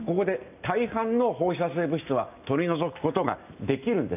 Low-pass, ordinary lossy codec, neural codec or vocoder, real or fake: 3.6 kHz; none; none; real